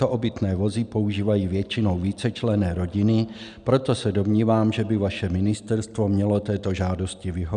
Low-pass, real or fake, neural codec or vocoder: 9.9 kHz; real; none